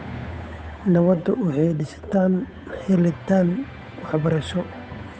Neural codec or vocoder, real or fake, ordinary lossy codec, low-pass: codec, 16 kHz, 8 kbps, FunCodec, trained on Chinese and English, 25 frames a second; fake; none; none